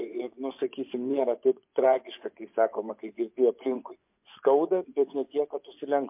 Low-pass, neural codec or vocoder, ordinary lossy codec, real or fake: 3.6 kHz; vocoder, 24 kHz, 100 mel bands, Vocos; MP3, 32 kbps; fake